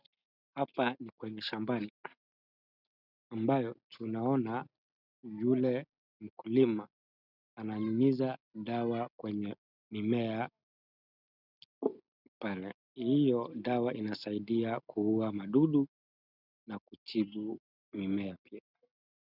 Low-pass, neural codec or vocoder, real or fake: 5.4 kHz; none; real